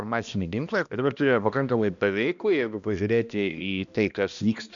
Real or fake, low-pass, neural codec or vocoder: fake; 7.2 kHz; codec, 16 kHz, 1 kbps, X-Codec, HuBERT features, trained on balanced general audio